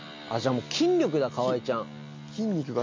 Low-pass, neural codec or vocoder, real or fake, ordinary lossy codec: 7.2 kHz; none; real; MP3, 48 kbps